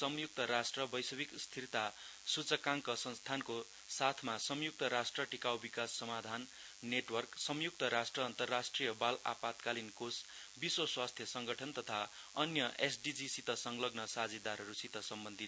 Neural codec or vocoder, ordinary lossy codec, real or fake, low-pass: none; none; real; none